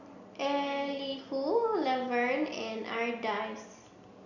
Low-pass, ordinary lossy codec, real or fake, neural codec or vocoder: 7.2 kHz; none; real; none